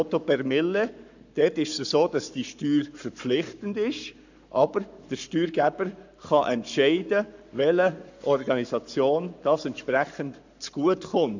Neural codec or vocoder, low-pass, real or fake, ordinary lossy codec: codec, 44.1 kHz, 7.8 kbps, Pupu-Codec; 7.2 kHz; fake; none